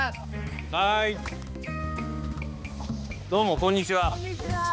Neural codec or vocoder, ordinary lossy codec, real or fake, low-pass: codec, 16 kHz, 2 kbps, X-Codec, HuBERT features, trained on balanced general audio; none; fake; none